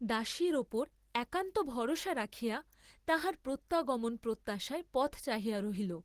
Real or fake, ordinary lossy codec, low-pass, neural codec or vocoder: real; Opus, 16 kbps; 14.4 kHz; none